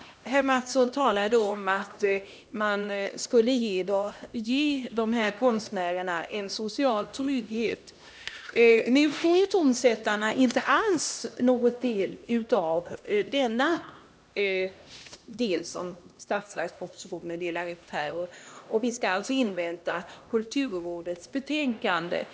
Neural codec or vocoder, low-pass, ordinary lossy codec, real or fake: codec, 16 kHz, 1 kbps, X-Codec, HuBERT features, trained on LibriSpeech; none; none; fake